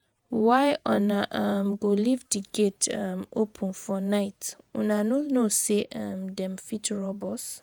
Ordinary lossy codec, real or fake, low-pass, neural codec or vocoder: none; fake; none; vocoder, 48 kHz, 128 mel bands, Vocos